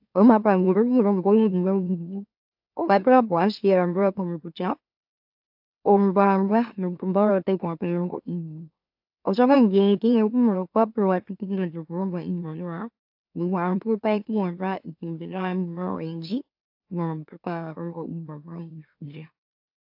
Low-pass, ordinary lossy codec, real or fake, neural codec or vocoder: 5.4 kHz; AAC, 48 kbps; fake; autoencoder, 44.1 kHz, a latent of 192 numbers a frame, MeloTTS